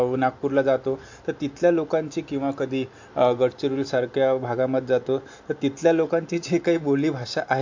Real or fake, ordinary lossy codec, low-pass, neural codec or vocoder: real; MP3, 48 kbps; 7.2 kHz; none